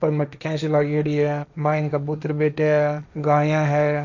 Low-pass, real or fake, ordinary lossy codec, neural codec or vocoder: 7.2 kHz; fake; none; codec, 16 kHz, 1.1 kbps, Voila-Tokenizer